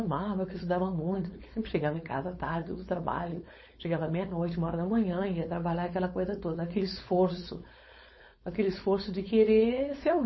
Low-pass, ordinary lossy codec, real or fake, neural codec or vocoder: 7.2 kHz; MP3, 24 kbps; fake; codec, 16 kHz, 4.8 kbps, FACodec